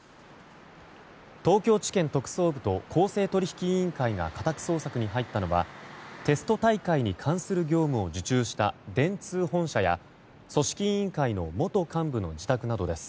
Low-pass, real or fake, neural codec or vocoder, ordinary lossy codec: none; real; none; none